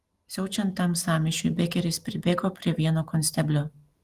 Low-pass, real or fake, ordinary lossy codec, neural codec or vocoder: 14.4 kHz; real; Opus, 24 kbps; none